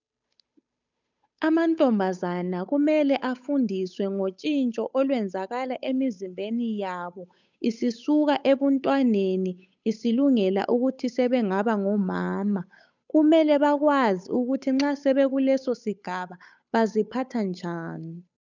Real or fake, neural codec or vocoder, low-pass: fake; codec, 16 kHz, 8 kbps, FunCodec, trained on Chinese and English, 25 frames a second; 7.2 kHz